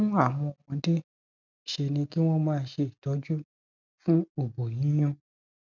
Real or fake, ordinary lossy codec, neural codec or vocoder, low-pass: real; none; none; 7.2 kHz